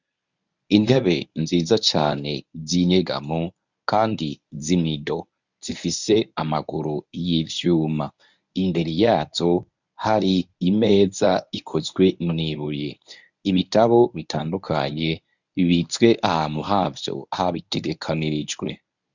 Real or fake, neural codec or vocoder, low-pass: fake; codec, 24 kHz, 0.9 kbps, WavTokenizer, medium speech release version 1; 7.2 kHz